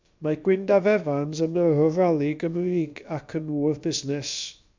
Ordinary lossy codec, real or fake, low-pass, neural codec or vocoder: MP3, 64 kbps; fake; 7.2 kHz; codec, 16 kHz, about 1 kbps, DyCAST, with the encoder's durations